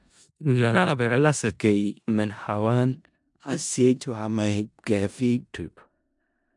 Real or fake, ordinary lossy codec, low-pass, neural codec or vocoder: fake; MP3, 96 kbps; 10.8 kHz; codec, 16 kHz in and 24 kHz out, 0.4 kbps, LongCat-Audio-Codec, four codebook decoder